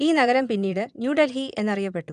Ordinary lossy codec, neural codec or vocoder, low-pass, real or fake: none; vocoder, 22.05 kHz, 80 mel bands, Vocos; 9.9 kHz; fake